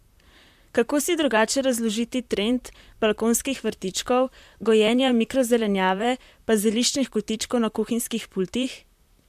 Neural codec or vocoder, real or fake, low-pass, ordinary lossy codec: vocoder, 44.1 kHz, 128 mel bands, Pupu-Vocoder; fake; 14.4 kHz; MP3, 96 kbps